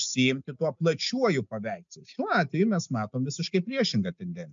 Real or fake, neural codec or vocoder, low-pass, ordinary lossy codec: real; none; 7.2 kHz; MP3, 64 kbps